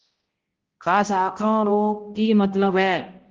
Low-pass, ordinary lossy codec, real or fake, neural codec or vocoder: 7.2 kHz; Opus, 16 kbps; fake; codec, 16 kHz, 0.5 kbps, X-Codec, HuBERT features, trained on balanced general audio